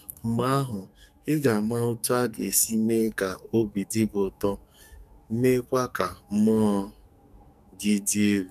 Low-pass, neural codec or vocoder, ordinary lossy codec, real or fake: 14.4 kHz; codec, 44.1 kHz, 2.6 kbps, SNAC; none; fake